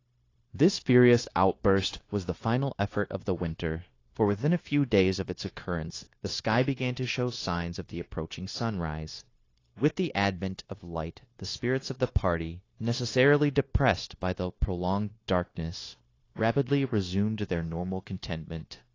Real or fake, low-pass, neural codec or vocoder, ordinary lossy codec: fake; 7.2 kHz; codec, 16 kHz, 0.9 kbps, LongCat-Audio-Codec; AAC, 32 kbps